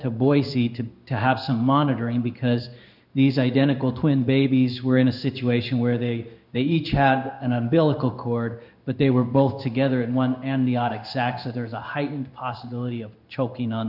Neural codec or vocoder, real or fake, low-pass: codec, 16 kHz in and 24 kHz out, 1 kbps, XY-Tokenizer; fake; 5.4 kHz